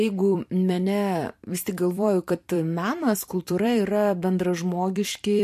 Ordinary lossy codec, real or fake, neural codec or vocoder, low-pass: MP3, 64 kbps; fake; vocoder, 44.1 kHz, 128 mel bands every 256 samples, BigVGAN v2; 14.4 kHz